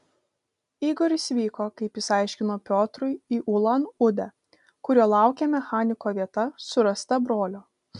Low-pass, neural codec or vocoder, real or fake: 10.8 kHz; none; real